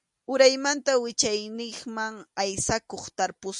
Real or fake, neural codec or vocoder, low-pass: real; none; 10.8 kHz